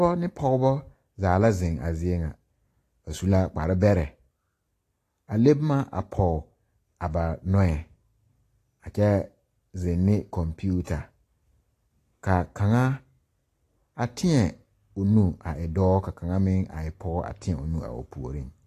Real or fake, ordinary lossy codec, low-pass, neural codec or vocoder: real; AAC, 48 kbps; 14.4 kHz; none